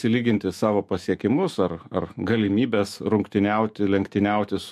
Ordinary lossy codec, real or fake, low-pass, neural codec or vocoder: MP3, 64 kbps; fake; 14.4 kHz; autoencoder, 48 kHz, 128 numbers a frame, DAC-VAE, trained on Japanese speech